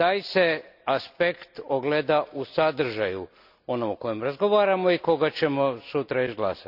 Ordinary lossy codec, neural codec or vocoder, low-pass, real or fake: none; none; 5.4 kHz; real